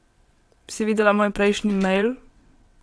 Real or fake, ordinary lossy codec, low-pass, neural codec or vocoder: fake; none; none; vocoder, 22.05 kHz, 80 mel bands, WaveNeXt